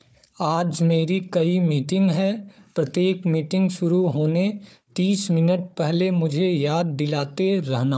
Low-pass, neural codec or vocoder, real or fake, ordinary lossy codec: none; codec, 16 kHz, 4 kbps, FunCodec, trained on Chinese and English, 50 frames a second; fake; none